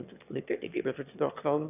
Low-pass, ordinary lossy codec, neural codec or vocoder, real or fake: 3.6 kHz; none; autoencoder, 22.05 kHz, a latent of 192 numbers a frame, VITS, trained on one speaker; fake